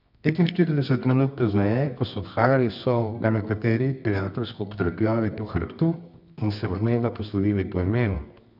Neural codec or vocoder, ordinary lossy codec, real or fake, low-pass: codec, 24 kHz, 0.9 kbps, WavTokenizer, medium music audio release; none; fake; 5.4 kHz